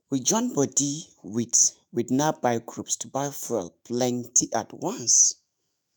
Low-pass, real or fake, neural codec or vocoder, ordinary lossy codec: none; fake; autoencoder, 48 kHz, 128 numbers a frame, DAC-VAE, trained on Japanese speech; none